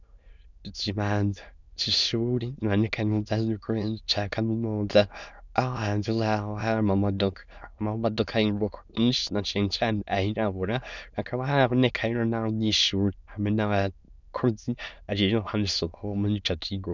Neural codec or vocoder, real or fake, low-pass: autoencoder, 22.05 kHz, a latent of 192 numbers a frame, VITS, trained on many speakers; fake; 7.2 kHz